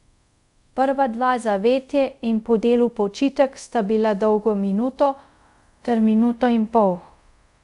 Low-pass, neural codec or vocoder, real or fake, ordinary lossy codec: 10.8 kHz; codec, 24 kHz, 0.5 kbps, DualCodec; fake; none